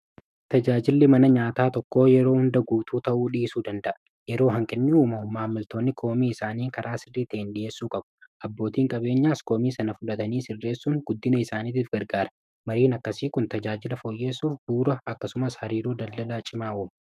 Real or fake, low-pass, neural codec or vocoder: fake; 14.4 kHz; autoencoder, 48 kHz, 128 numbers a frame, DAC-VAE, trained on Japanese speech